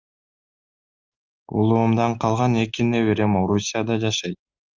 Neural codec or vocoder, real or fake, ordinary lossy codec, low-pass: none; real; Opus, 32 kbps; 7.2 kHz